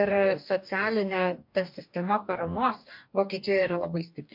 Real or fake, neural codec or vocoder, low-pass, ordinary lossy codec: fake; codec, 44.1 kHz, 2.6 kbps, DAC; 5.4 kHz; MP3, 48 kbps